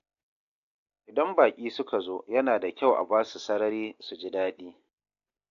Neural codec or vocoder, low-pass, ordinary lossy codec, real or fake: none; 7.2 kHz; AAC, 48 kbps; real